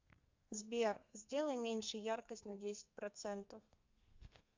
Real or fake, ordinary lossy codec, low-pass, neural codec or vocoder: fake; MP3, 64 kbps; 7.2 kHz; codec, 32 kHz, 1.9 kbps, SNAC